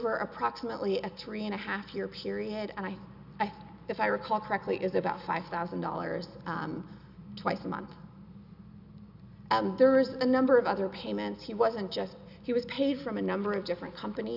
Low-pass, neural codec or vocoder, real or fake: 5.4 kHz; none; real